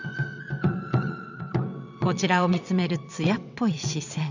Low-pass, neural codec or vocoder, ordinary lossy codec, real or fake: 7.2 kHz; vocoder, 22.05 kHz, 80 mel bands, WaveNeXt; none; fake